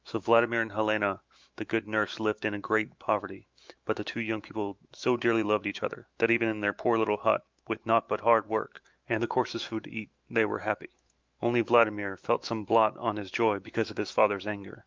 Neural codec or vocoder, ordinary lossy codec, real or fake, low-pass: autoencoder, 48 kHz, 128 numbers a frame, DAC-VAE, trained on Japanese speech; Opus, 24 kbps; fake; 7.2 kHz